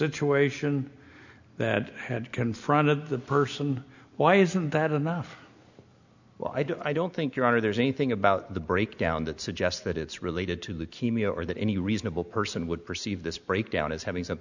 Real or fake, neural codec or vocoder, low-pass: real; none; 7.2 kHz